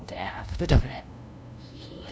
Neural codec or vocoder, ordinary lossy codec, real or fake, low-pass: codec, 16 kHz, 0.5 kbps, FunCodec, trained on LibriTTS, 25 frames a second; none; fake; none